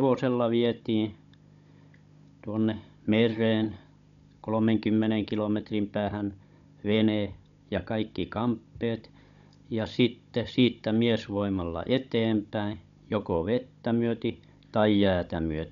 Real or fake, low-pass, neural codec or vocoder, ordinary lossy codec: fake; 7.2 kHz; codec, 16 kHz, 16 kbps, FunCodec, trained on Chinese and English, 50 frames a second; none